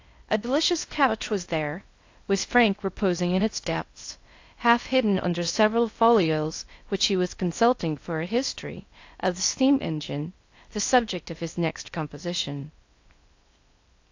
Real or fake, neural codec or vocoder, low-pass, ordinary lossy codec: fake; codec, 16 kHz in and 24 kHz out, 0.6 kbps, FocalCodec, streaming, 2048 codes; 7.2 kHz; AAC, 48 kbps